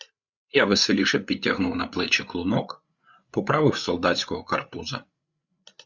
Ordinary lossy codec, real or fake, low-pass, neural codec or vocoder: Opus, 64 kbps; fake; 7.2 kHz; codec, 16 kHz, 8 kbps, FreqCodec, larger model